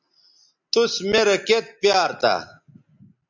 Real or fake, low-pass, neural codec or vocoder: real; 7.2 kHz; none